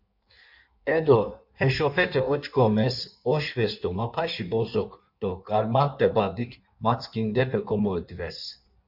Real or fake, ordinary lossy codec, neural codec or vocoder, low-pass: fake; MP3, 48 kbps; codec, 16 kHz in and 24 kHz out, 1.1 kbps, FireRedTTS-2 codec; 5.4 kHz